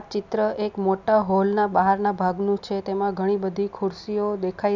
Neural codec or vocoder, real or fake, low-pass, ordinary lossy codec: none; real; 7.2 kHz; none